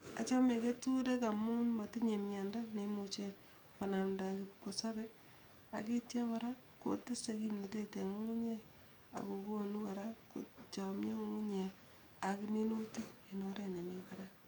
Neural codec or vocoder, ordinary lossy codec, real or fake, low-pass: codec, 44.1 kHz, 7.8 kbps, DAC; none; fake; 19.8 kHz